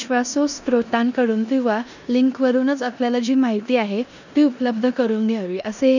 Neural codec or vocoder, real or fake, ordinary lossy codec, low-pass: codec, 16 kHz in and 24 kHz out, 0.9 kbps, LongCat-Audio-Codec, four codebook decoder; fake; none; 7.2 kHz